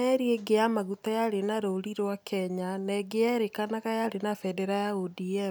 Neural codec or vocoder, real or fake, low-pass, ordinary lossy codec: none; real; none; none